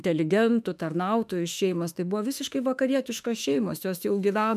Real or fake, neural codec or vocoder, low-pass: fake; autoencoder, 48 kHz, 32 numbers a frame, DAC-VAE, trained on Japanese speech; 14.4 kHz